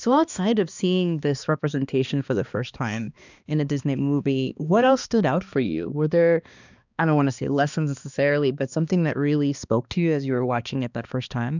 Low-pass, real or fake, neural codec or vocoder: 7.2 kHz; fake; codec, 16 kHz, 2 kbps, X-Codec, HuBERT features, trained on balanced general audio